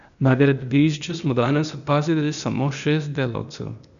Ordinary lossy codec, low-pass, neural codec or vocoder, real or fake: none; 7.2 kHz; codec, 16 kHz, 0.8 kbps, ZipCodec; fake